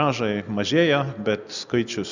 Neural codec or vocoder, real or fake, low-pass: none; real; 7.2 kHz